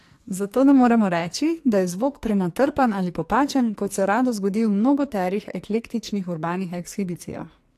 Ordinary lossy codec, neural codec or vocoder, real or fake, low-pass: AAC, 64 kbps; codec, 44.1 kHz, 2.6 kbps, SNAC; fake; 14.4 kHz